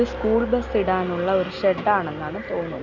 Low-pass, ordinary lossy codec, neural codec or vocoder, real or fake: 7.2 kHz; none; none; real